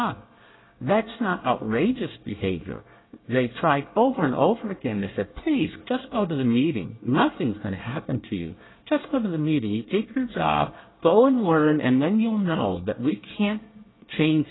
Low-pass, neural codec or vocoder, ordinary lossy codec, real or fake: 7.2 kHz; codec, 24 kHz, 1 kbps, SNAC; AAC, 16 kbps; fake